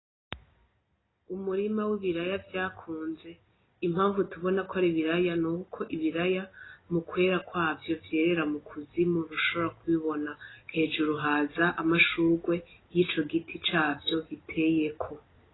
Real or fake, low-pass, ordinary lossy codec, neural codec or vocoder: real; 7.2 kHz; AAC, 16 kbps; none